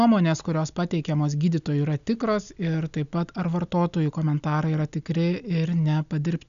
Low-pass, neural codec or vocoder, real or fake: 7.2 kHz; none; real